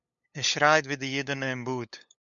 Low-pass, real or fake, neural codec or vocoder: 7.2 kHz; fake; codec, 16 kHz, 8 kbps, FunCodec, trained on LibriTTS, 25 frames a second